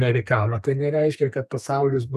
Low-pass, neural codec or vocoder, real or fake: 14.4 kHz; codec, 32 kHz, 1.9 kbps, SNAC; fake